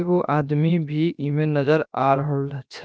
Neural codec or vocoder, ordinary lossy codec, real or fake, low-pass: codec, 16 kHz, 0.7 kbps, FocalCodec; none; fake; none